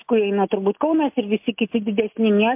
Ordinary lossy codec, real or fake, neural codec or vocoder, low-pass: MP3, 32 kbps; real; none; 3.6 kHz